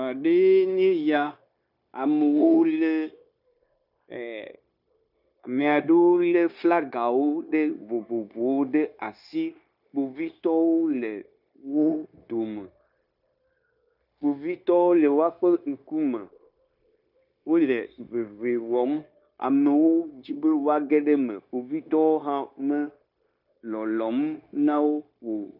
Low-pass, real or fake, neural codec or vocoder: 5.4 kHz; fake; codec, 16 kHz, 0.9 kbps, LongCat-Audio-Codec